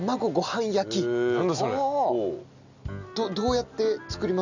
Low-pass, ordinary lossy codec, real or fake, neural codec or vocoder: 7.2 kHz; none; real; none